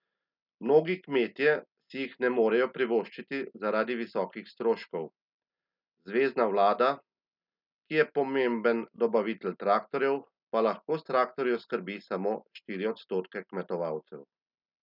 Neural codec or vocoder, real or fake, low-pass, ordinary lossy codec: none; real; 5.4 kHz; none